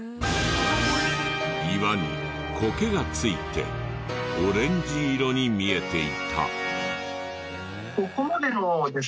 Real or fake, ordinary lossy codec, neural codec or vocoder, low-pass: real; none; none; none